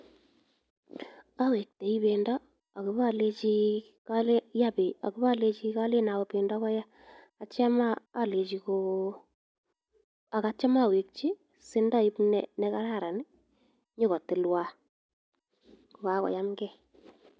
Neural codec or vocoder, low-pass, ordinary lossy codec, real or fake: none; none; none; real